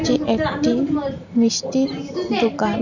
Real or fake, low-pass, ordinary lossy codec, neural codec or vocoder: fake; 7.2 kHz; none; vocoder, 44.1 kHz, 128 mel bands every 512 samples, BigVGAN v2